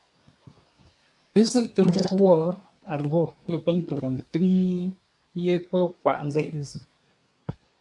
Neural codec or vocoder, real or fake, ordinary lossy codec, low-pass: codec, 24 kHz, 1 kbps, SNAC; fake; MP3, 64 kbps; 10.8 kHz